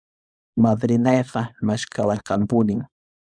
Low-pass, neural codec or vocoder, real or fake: 9.9 kHz; codec, 24 kHz, 0.9 kbps, WavTokenizer, small release; fake